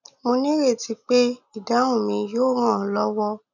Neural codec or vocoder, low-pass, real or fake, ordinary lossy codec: none; 7.2 kHz; real; none